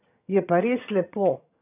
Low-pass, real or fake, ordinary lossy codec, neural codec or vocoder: 3.6 kHz; fake; none; vocoder, 22.05 kHz, 80 mel bands, HiFi-GAN